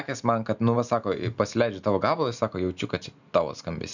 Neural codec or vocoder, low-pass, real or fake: none; 7.2 kHz; real